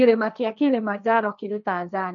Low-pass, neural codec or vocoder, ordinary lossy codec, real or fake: 7.2 kHz; codec, 16 kHz, 1.1 kbps, Voila-Tokenizer; none; fake